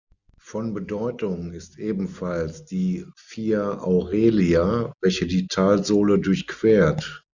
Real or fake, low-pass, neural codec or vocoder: real; 7.2 kHz; none